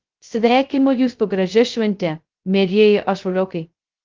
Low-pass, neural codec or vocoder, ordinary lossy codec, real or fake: 7.2 kHz; codec, 16 kHz, 0.2 kbps, FocalCodec; Opus, 32 kbps; fake